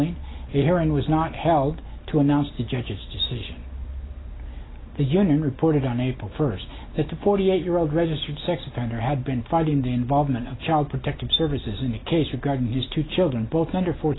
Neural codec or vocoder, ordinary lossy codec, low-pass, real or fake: none; AAC, 16 kbps; 7.2 kHz; real